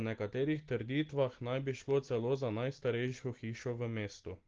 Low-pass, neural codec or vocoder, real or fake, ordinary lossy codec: 7.2 kHz; none; real; Opus, 16 kbps